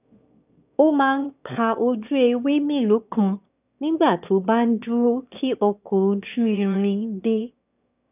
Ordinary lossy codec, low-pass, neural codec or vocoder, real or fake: none; 3.6 kHz; autoencoder, 22.05 kHz, a latent of 192 numbers a frame, VITS, trained on one speaker; fake